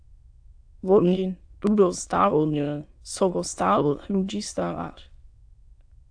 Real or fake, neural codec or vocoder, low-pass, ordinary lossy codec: fake; autoencoder, 22.05 kHz, a latent of 192 numbers a frame, VITS, trained on many speakers; 9.9 kHz; AAC, 64 kbps